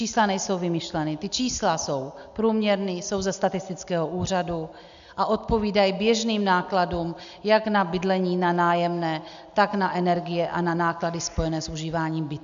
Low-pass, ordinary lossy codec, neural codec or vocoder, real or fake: 7.2 kHz; AAC, 96 kbps; none; real